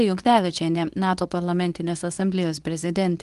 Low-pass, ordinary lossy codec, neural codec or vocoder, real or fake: 10.8 kHz; Opus, 24 kbps; codec, 24 kHz, 0.9 kbps, WavTokenizer, medium speech release version 2; fake